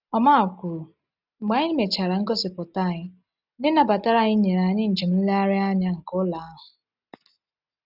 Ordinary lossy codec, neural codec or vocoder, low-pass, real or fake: none; none; 5.4 kHz; real